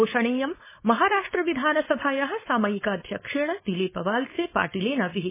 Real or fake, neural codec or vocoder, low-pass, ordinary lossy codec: fake; codec, 16 kHz, 4.8 kbps, FACodec; 3.6 kHz; MP3, 16 kbps